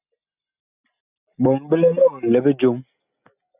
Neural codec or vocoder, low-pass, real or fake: none; 3.6 kHz; real